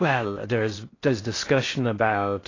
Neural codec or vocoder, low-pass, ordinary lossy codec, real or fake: codec, 16 kHz in and 24 kHz out, 0.6 kbps, FocalCodec, streaming, 4096 codes; 7.2 kHz; AAC, 32 kbps; fake